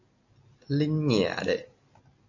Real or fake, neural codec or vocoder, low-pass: real; none; 7.2 kHz